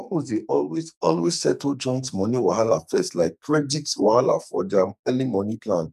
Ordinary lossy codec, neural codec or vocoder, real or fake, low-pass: none; codec, 44.1 kHz, 2.6 kbps, SNAC; fake; 14.4 kHz